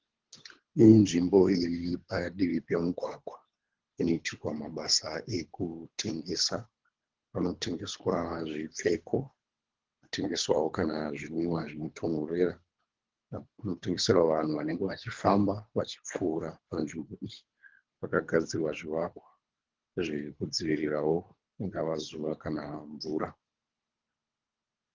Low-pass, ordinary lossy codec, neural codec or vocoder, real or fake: 7.2 kHz; Opus, 16 kbps; codec, 24 kHz, 3 kbps, HILCodec; fake